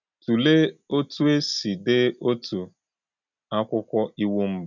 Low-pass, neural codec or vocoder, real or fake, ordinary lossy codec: 7.2 kHz; none; real; none